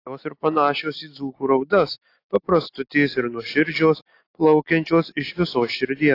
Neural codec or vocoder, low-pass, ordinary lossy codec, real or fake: none; 5.4 kHz; AAC, 32 kbps; real